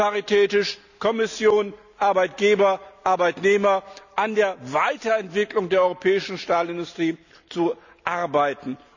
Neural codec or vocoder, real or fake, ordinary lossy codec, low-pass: none; real; none; 7.2 kHz